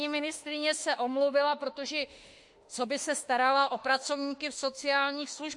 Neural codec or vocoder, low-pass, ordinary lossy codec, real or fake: autoencoder, 48 kHz, 32 numbers a frame, DAC-VAE, trained on Japanese speech; 10.8 kHz; MP3, 48 kbps; fake